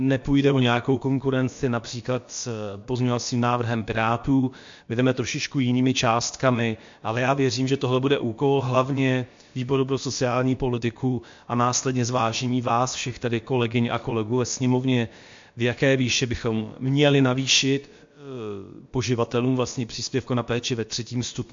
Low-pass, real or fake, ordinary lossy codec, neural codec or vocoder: 7.2 kHz; fake; MP3, 48 kbps; codec, 16 kHz, about 1 kbps, DyCAST, with the encoder's durations